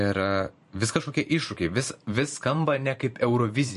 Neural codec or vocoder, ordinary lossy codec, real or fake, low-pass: vocoder, 44.1 kHz, 128 mel bands every 512 samples, BigVGAN v2; MP3, 48 kbps; fake; 14.4 kHz